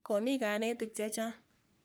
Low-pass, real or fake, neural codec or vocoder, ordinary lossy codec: none; fake; codec, 44.1 kHz, 3.4 kbps, Pupu-Codec; none